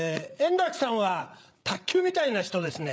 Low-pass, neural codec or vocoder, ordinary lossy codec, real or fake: none; codec, 16 kHz, 8 kbps, FreqCodec, larger model; none; fake